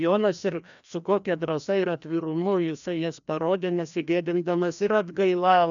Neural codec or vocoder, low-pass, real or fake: codec, 16 kHz, 1 kbps, FreqCodec, larger model; 7.2 kHz; fake